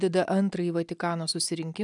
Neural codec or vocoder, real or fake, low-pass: none; real; 10.8 kHz